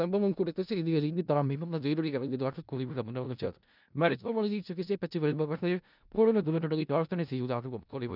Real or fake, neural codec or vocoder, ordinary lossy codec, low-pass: fake; codec, 16 kHz in and 24 kHz out, 0.4 kbps, LongCat-Audio-Codec, four codebook decoder; none; 5.4 kHz